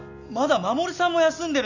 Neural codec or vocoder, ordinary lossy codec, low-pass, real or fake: none; none; 7.2 kHz; real